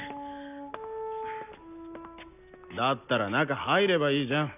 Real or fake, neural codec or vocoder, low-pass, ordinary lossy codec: real; none; 3.6 kHz; none